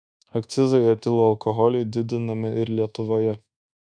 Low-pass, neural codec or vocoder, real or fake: 9.9 kHz; codec, 24 kHz, 1.2 kbps, DualCodec; fake